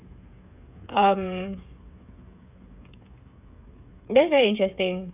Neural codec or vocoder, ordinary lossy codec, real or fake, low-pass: codec, 16 kHz, 8 kbps, FreqCodec, smaller model; none; fake; 3.6 kHz